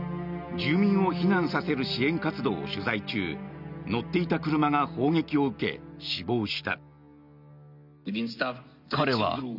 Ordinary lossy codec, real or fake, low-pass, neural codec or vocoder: none; real; 5.4 kHz; none